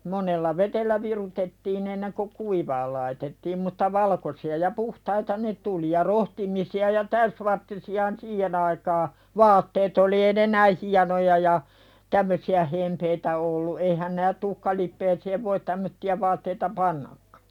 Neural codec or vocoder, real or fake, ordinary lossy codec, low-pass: none; real; none; 19.8 kHz